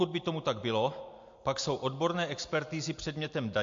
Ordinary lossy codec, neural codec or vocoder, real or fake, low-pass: MP3, 48 kbps; none; real; 7.2 kHz